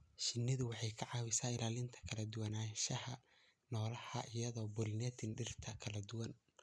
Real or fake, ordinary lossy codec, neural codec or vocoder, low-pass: real; none; none; none